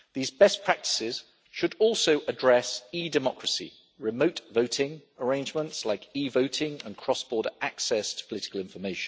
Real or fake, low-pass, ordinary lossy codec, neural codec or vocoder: real; none; none; none